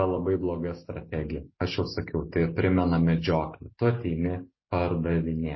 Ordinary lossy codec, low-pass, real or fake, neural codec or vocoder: MP3, 24 kbps; 7.2 kHz; real; none